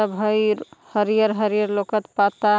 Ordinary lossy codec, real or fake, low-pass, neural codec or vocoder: none; real; none; none